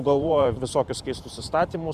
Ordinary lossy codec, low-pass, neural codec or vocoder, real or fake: Opus, 64 kbps; 14.4 kHz; vocoder, 44.1 kHz, 128 mel bands every 512 samples, BigVGAN v2; fake